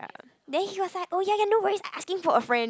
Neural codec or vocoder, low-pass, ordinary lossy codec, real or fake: none; none; none; real